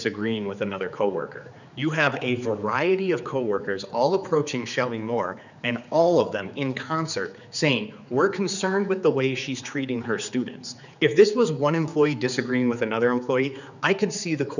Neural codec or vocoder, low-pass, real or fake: codec, 16 kHz, 4 kbps, X-Codec, HuBERT features, trained on general audio; 7.2 kHz; fake